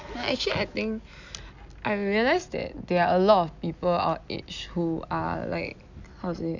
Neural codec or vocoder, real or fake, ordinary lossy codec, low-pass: none; real; none; 7.2 kHz